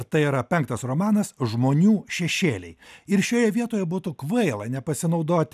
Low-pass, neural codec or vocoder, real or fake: 14.4 kHz; none; real